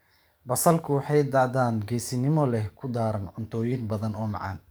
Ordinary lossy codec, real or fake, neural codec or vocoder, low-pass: none; fake; codec, 44.1 kHz, 7.8 kbps, DAC; none